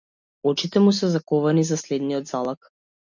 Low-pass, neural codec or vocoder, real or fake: 7.2 kHz; none; real